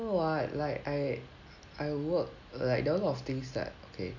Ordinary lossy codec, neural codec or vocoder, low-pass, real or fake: none; none; 7.2 kHz; real